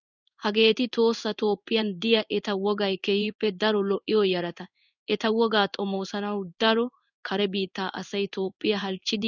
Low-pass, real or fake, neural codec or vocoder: 7.2 kHz; fake; codec, 16 kHz in and 24 kHz out, 1 kbps, XY-Tokenizer